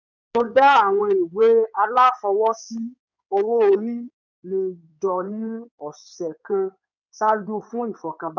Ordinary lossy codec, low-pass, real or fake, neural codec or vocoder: none; 7.2 kHz; fake; codec, 16 kHz in and 24 kHz out, 2.2 kbps, FireRedTTS-2 codec